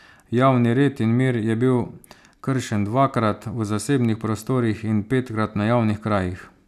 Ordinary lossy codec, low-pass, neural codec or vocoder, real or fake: none; 14.4 kHz; none; real